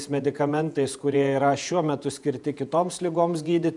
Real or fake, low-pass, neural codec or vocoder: fake; 10.8 kHz; vocoder, 48 kHz, 128 mel bands, Vocos